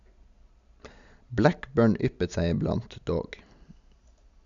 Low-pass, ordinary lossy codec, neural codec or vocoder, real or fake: 7.2 kHz; none; none; real